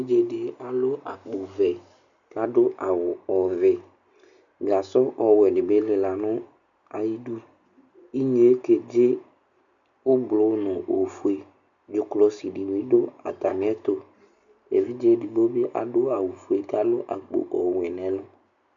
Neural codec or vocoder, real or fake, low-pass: none; real; 7.2 kHz